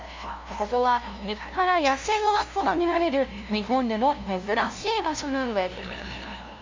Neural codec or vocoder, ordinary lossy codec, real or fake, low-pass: codec, 16 kHz, 0.5 kbps, FunCodec, trained on LibriTTS, 25 frames a second; MP3, 64 kbps; fake; 7.2 kHz